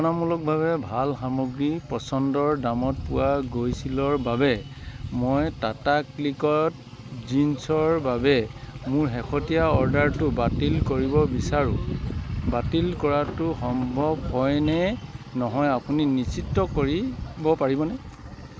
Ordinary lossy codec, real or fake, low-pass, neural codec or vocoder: none; real; none; none